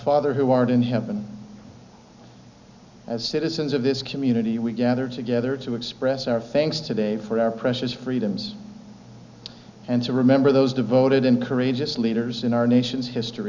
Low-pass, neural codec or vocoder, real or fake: 7.2 kHz; none; real